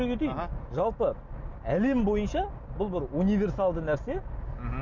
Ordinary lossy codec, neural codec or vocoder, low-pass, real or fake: none; none; 7.2 kHz; real